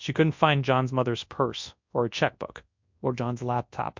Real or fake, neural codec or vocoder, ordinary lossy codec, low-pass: fake; codec, 24 kHz, 0.9 kbps, WavTokenizer, large speech release; MP3, 64 kbps; 7.2 kHz